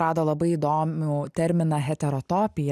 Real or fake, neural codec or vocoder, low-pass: real; none; 14.4 kHz